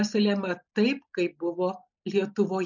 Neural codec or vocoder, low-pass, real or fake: none; 7.2 kHz; real